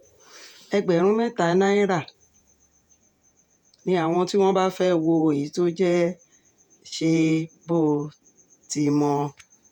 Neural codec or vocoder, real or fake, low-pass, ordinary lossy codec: vocoder, 48 kHz, 128 mel bands, Vocos; fake; 19.8 kHz; none